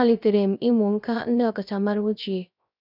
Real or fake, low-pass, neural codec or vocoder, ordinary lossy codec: fake; 5.4 kHz; codec, 16 kHz, 0.3 kbps, FocalCodec; none